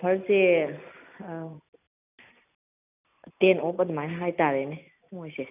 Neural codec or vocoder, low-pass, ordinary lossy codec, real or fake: none; 3.6 kHz; none; real